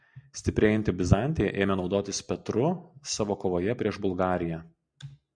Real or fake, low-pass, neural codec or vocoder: real; 9.9 kHz; none